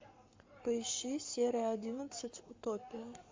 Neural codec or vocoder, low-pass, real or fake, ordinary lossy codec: codec, 44.1 kHz, 7.8 kbps, Pupu-Codec; 7.2 kHz; fake; MP3, 48 kbps